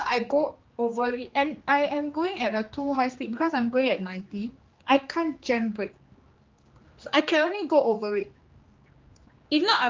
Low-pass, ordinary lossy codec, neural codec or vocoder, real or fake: 7.2 kHz; Opus, 32 kbps; codec, 16 kHz, 2 kbps, X-Codec, HuBERT features, trained on general audio; fake